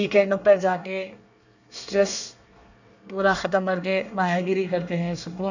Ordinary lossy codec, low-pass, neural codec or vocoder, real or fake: none; 7.2 kHz; codec, 24 kHz, 1 kbps, SNAC; fake